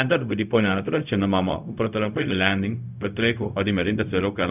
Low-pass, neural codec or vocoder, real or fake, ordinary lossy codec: 3.6 kHz; codec, 16 kHz, 0.4 kbps, LongCat-Audio-Codec; fake; none